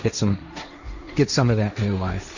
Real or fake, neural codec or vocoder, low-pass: fake; codec, 16 kHz, 1.1 kbps, Voila-Tokenizer; 7.2 kHz